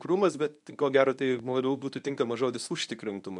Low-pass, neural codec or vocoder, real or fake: 10.8 kHz; codec, 24 kHz, 0.9 kbps, WavTokenizer, medium speech release version 1; fake